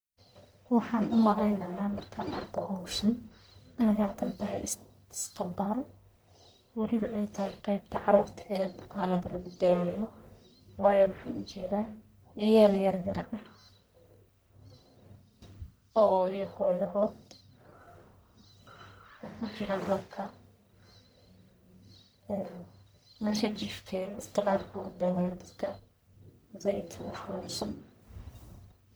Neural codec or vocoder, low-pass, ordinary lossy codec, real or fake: codec, 44.1 kHz, 1.7 kbps, Pupu-Codec; none; none; fake